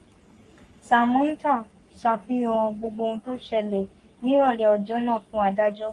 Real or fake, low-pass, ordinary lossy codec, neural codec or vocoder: fake; 10.8 kHz; Opus, 32 kbps; codec, 44.1 kHz, 3.4 kbps, Pupu-Codec